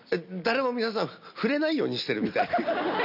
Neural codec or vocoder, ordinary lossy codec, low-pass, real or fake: none; none; 5.4 kHz; real